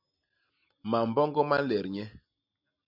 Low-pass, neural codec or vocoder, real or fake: 5.4 kHz; none; real